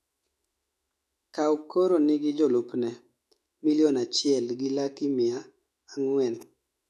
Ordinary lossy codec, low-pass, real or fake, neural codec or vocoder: none; 14.4 kHz; fake; autoencoder, 48 kHz, 128 numbers a frame, DAC-VAE, trained on Japanese speech